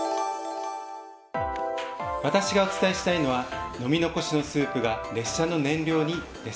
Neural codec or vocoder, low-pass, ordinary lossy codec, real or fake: none; none; none; real